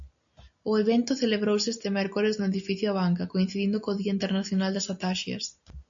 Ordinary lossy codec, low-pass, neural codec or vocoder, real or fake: AAC, 64 kbps; 7.2 kHz; none; real